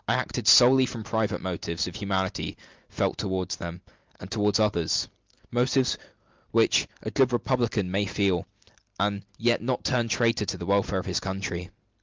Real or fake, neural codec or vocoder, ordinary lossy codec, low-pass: real; none; Opus, 32 kbps; 7.2 kHz